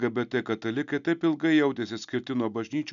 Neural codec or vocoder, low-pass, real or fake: none; 7.2 kHz; real